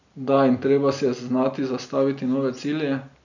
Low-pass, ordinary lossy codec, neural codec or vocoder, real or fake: 7.2 kHz; none; none; real